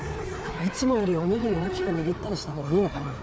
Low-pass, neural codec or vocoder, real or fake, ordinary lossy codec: none; codec, 16 kHz, 4 kbps, FreqCodec, larger model; fake; none